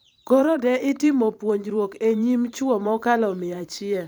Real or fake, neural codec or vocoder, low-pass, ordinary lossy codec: fake; vocoder, 44.1 kHz, 128 mel bands every 512 samples, BigVGAN v2; none; none